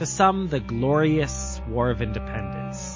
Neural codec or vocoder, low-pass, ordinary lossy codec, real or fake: none; 7.2 kHz; MP3, 32 kbps; real